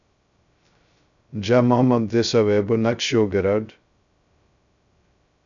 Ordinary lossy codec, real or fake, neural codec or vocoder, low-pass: MP3, 96 kbps; fake; codec, 16 kHz, 0.2 kbps, FocalCodec; 7.2 kHz